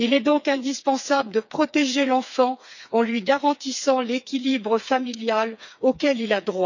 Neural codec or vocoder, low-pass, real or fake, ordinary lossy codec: codec, 16 kHz, 4 kbps, FreqCodec, smaller model; 7.2 kHz; fake; none